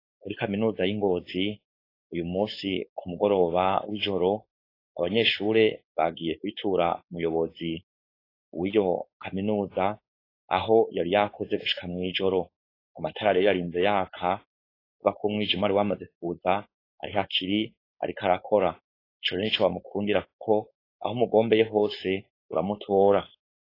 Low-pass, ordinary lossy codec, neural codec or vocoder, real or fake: 5.4 kHz; AAC, 32 kbps; codec, 16 kHz, 4.8 kbps, FACodec; fake